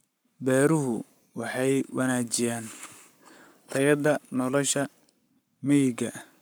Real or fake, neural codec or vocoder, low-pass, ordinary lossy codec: fake; codec, 44.1 kHz, 7.8 kbps, Pupu-Codec; none; none